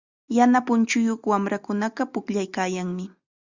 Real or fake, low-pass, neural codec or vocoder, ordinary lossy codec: real; 7.2 kHz; none; Opus, 64 kbps